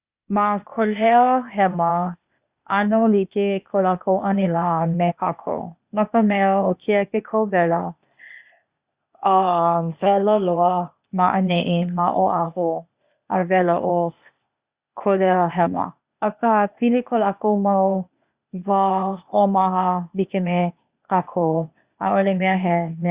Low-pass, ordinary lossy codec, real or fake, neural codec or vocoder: 3.6 kHz; Opus, 64 kbps; fake; codec, 16 kHz, 0.8 kbps, ZipCodec